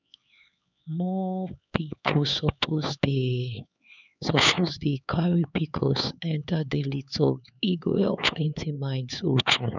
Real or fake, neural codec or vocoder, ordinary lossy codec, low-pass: fake; codec, 16 kHz, 4 kbps, X-Codec, HuBERT features, trained on LibriSpeech; none; 7.2 kHz